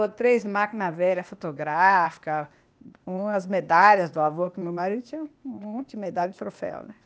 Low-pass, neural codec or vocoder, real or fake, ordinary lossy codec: none; codec, 16 kHz, 0.8 kbps, ZipCodec; fake; none